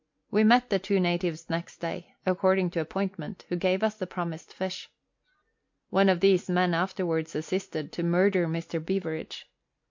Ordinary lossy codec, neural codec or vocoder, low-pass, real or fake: MP3, 48 kbps; none; 7.2 kHz; real